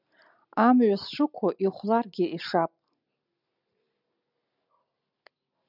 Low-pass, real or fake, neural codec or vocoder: 5.4 kHz; real; none